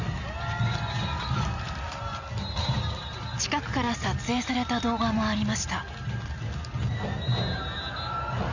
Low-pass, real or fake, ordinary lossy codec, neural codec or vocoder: 7.2 kHz; real; none; none